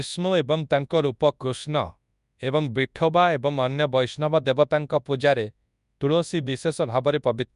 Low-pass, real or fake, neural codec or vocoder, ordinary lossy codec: 10.8 kHz; fake; codec, 24 kHz, 0.9 kbps, WavTokenizer, large speech release; none